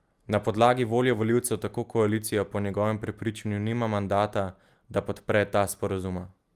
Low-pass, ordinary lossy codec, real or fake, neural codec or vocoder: 14.4 kHz; Opus, 32 kbps; real; none